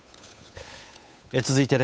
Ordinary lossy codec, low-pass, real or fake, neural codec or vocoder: none; none; fake; codec, 16 kHz, 8 kbps, FunCodec, trained on Chinese and English, 25 frames a second